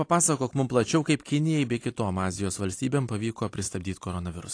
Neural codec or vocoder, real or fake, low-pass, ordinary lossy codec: none; real; 9.9 kHz; AAC, 48 kbps